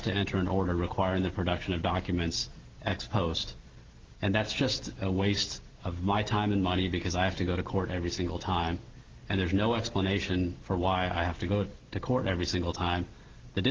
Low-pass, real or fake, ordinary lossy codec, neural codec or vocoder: 7.2 kHz; real; Opus, 16 kbps; none